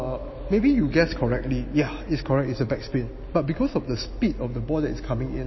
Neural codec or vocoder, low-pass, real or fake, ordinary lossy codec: none; 7.2 kHz; real; MP3, 24 kbps